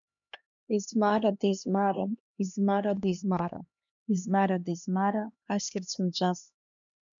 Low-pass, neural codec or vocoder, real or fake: 7.2 kHz; codec, 16 kHz, 2 kbps, X-Codec, HuBERT features, trained on LibriSpeech; fake